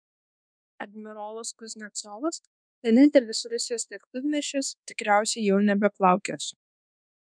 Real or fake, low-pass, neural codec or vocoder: fake; 9.9 kHz; codec, 24 kHz, 1.2 kbps, DualCodec